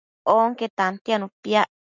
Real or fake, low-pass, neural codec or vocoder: real; 7.2 kHz; none